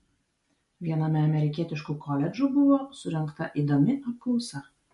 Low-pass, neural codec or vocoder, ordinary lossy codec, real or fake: 14.4 kHz; none; MP3, 48 kbps; real